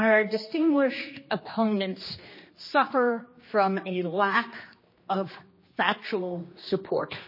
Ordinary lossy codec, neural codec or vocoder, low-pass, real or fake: MP3, 24 kbps; codec, 16 kHz, 2 kbps, X-Codec, HuBERT features, trained on general audio; 5.4 kHz; fake